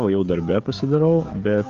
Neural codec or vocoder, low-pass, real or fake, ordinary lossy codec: codec, 16 kHz, 16 kbps, FreqCodec, smaller model; 7.2 kHz; fake; Opus, 32 kbps